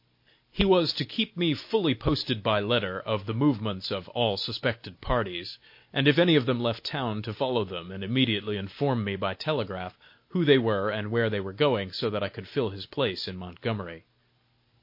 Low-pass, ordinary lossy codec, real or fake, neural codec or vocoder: 5.4 kHz; MP3, 32 kbps; real; none